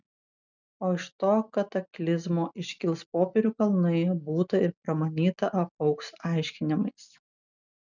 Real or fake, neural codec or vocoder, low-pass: real; none; 7.2 kHz